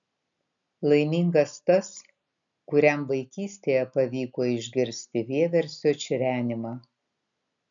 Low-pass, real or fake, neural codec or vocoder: 7.2 kHz; real; none